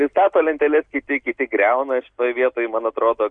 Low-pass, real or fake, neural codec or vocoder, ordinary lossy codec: 9.9 kHz; real; none; Opus, 64 kbps